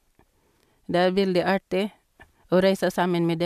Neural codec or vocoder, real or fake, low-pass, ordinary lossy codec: none; real; 14.4 kHz; MP3, 96 kbps